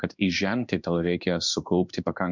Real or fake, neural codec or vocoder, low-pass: fake; codec, 16 kHz in and 24 kHz out, 1 kbps, XY-Tokenizer; 7.2 kHz